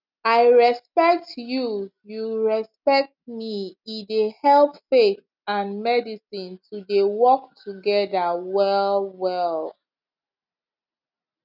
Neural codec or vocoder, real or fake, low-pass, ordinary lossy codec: none; real; 5.4 kHz; none